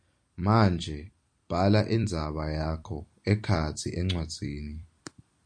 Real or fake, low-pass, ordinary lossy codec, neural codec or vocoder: fake; 9.9 kHz; MP3, 96 kbps; vocoder, 44.1 kHz, 128 mel bands every 256 samples, BigVGAN v2